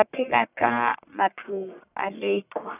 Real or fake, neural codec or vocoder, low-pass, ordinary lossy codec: fake; codec, 44.1 kHz, 1.7 kbps, Pupu-Codec; 3.6 kHz; none